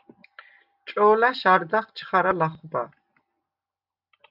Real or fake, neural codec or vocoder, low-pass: real; none; 5.4 kHz